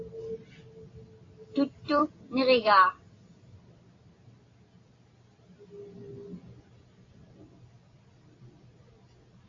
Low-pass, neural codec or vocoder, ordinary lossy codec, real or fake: 7.2 kHz; none; AAC, 32 kbps; real